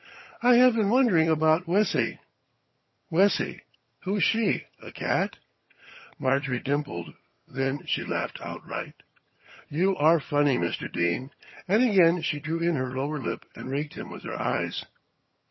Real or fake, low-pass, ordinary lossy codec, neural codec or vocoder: fake; 7.2 kHz; MP3, 24 kbps; vocoder, 22.05 kHz, 80 mel bands, HiFi-GAN